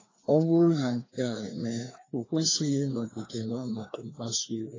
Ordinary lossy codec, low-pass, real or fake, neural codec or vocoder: AAC, 32 kbps; 7.2 kHz; fake; codec, 16 kHz, 2 kbps, FreqCodec, larger model